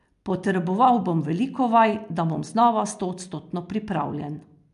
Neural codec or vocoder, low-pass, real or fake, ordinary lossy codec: none; 10.8 kHz; real; MP3, 64 kbps